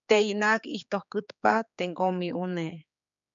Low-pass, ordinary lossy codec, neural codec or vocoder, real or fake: 7.2 kHz; MP3, 96 kbps; codec, 16 kHz, 4 kbps, X-Codec, HuBERT features, trained on general audio; fake